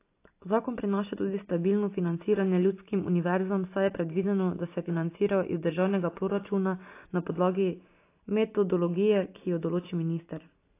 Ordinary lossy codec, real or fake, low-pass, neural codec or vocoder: AAC, 24 kbps; real; 3.6 kHz; none